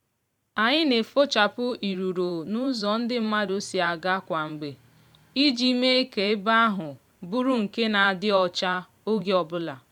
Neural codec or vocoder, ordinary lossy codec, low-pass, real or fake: vocoder, 44.1 kHz, 128 mel bands every 512 samples, BigVGAN v2; none; 19.8 kHz; fake